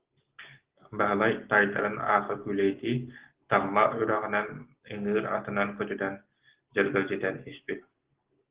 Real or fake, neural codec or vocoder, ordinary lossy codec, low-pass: real; none; Opus, 16 kbps; 3.6 kHz